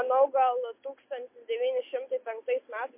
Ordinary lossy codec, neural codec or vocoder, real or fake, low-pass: MP3, 24 kbps; none; real; 3.6 kHz